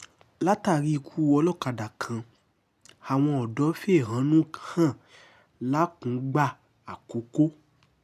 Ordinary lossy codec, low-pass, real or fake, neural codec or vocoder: none; 14.4 kHz; real; none